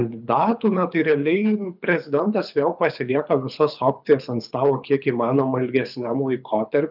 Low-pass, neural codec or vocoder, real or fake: 5.4 kHz; codec, 24 kHz, 6 kbps, HILCodec; fake